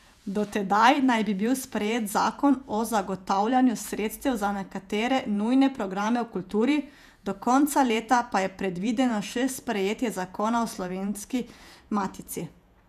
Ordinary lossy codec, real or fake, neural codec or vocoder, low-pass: none; fake; vocoder, 48 kHz, 128 mel bands, Vocos; 14.4 kHz